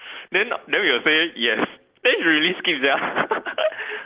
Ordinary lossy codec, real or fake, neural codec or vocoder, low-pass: Opus, 16 kbps; real; none; 3.6 kHz